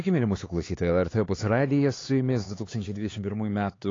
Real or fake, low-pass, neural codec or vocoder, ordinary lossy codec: fake; 7.2 kHz; codec, 16 kHz, 4 kbps, X-Codec, HuBERT features, trained on LibriSpeech; AAC, 32 kbps